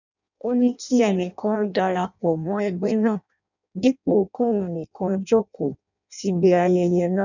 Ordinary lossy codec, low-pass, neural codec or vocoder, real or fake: none; 7.2 kHz; codec, 16 kHz in and 24 kHz out, 0.6 kbps, FireRedTTS-2 codec; fake